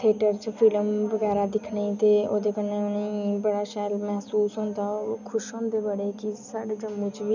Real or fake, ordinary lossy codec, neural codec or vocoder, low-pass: real; none; none; 7.2 kHz